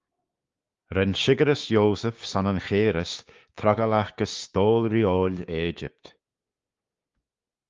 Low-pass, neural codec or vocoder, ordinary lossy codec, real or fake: 7.2 kHz; codec, 16 kHz, 6 kbps, DAC; Opus, 32 kbps; fake